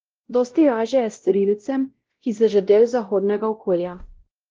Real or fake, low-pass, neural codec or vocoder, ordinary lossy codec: fake; 7.2 kHz; codec, 16 kHz, 0.5 kbps, X-Codec, WavLM features, trained on Multilingual LibriSpeech; Opus, 16 kbps